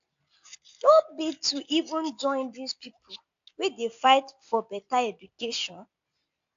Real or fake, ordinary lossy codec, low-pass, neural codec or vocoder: real; AAC, 64 kbps; 7.2 kHz; none